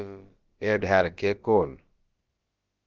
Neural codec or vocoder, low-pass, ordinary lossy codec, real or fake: codec, 16 kHz, about 1 kbps, DyCAST, with the encoder's durations; 7.2 kHz; Opus, 16 kbps; fake